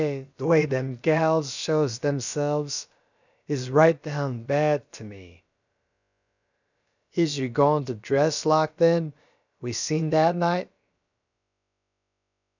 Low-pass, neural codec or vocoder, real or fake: 7.2 kHz; codec, 16 kHz, about 1 kbps, DyCAST, with the encoder's durations; fake